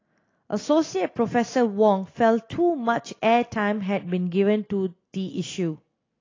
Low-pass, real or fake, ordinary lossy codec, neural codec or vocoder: 7.2 kHz; real; AAC, 32 kbps; none